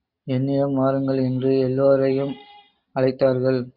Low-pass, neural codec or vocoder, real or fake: 5.4 kHz; none; real